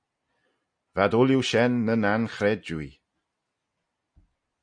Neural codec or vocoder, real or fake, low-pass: none; real; 9.9 kHz